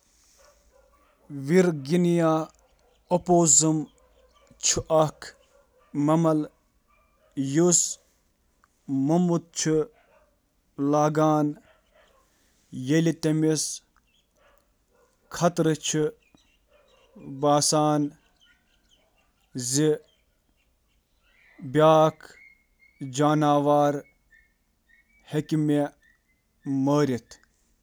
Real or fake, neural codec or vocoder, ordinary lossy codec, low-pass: real; none; none; none